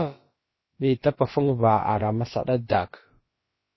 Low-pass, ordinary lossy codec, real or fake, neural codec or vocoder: 7.2 kHz; MP3, 24 kbps; fake; codec, 16 kHz, about 1 kbps, DyCAST, with the encoder's durations